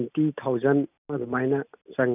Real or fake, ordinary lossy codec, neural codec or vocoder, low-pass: real; none; none; 3.6 kHz